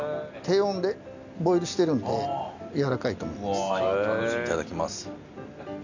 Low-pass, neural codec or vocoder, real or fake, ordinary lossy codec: 7.2 kHz; none; real; none